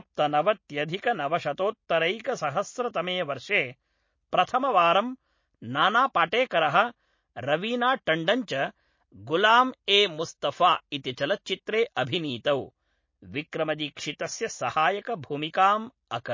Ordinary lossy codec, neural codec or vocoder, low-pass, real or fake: MP3, 32 kbps; none; 7.2 kHz; real